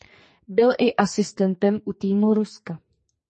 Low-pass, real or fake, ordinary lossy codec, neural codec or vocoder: 10.8 kHz; fake; MP3, 32 kbps; codec, 44.1 kHz, 2.6 kbps, SNAC